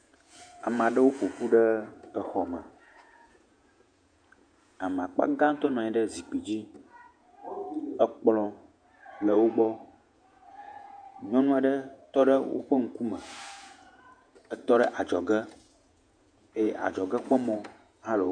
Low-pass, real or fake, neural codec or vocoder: 9.9 kHz; fake; vocoder, 48 kHz, 128 mel bands, Vocos